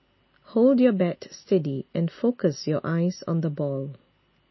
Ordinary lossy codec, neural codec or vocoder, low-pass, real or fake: MP3, 24 kbps; none; 7.2 kHz; real